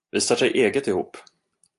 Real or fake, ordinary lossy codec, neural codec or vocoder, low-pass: real; AAC, 64 kbps; none; 10.8 kHz